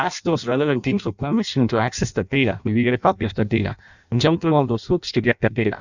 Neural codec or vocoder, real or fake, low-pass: codec, 16 kHz in and 24 kHz out, 0.6 kbps, FireRedTTS-2 codec; fake; 7.2 kHz